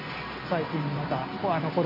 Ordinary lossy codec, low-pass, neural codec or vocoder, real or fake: none; 5.4 kHz; none; real